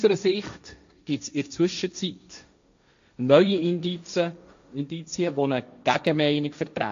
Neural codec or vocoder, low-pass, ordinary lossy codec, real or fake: codec, 16 kHz, 1.1 kbps, Voila-Tokenizer; 7.2 kHz; AAC, 64 kbps; fake